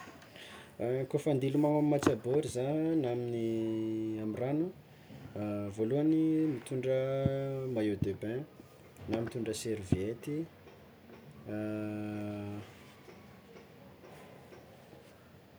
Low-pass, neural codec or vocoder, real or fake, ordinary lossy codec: none; none; real; none